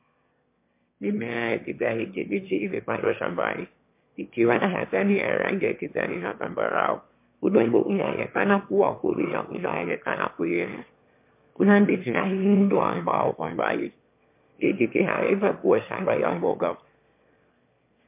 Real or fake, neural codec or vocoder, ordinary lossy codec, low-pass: fake; autoencoder, 22.05 kHz, a latent of 192 numbers a frame, VITS, trained on one speaker; MP3, 32 kbps; 3.6 kHz